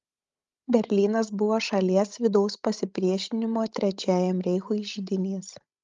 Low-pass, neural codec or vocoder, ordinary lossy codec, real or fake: 7.2 kHz; codec, 16 kHz, 16 kbps, FreqCodec, larger model; Opus, 32 kbps; fake